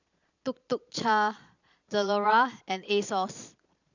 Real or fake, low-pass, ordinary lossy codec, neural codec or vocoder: fake; 7.2 kHz; none; vocoder, 22.05 kHz, 80 mel bands, Vocos